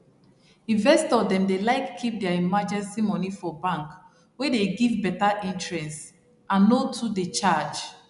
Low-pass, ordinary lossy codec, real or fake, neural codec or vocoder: 10.8 kHz; none; real; none